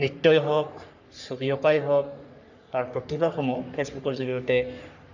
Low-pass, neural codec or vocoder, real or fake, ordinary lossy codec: 7.2 kHz; codec, 44.1 kHz, 3.4 kbps, Pupu-Codec; fake; none